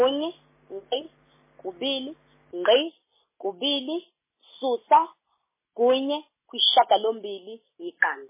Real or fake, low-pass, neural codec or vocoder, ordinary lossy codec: real; 3.6 kHz; none; MP3, 16 kbps